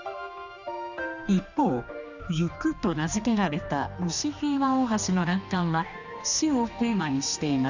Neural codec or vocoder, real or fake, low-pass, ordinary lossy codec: codec, 16 kHz, 2 kbps, X-Codec, HuBERT features, trained on general audio; fake; 7.2 kHz; none